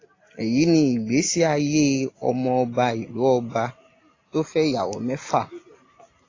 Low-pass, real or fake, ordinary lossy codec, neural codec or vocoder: 7.2 kHz; real; AAC, 32 kbps; none